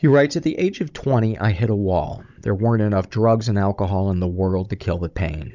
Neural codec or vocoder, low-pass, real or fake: codec, 16 kHz, 16 kbps, FunCodec, trained on Chinese and English, 50 frames a second; 7.2 kHz; fake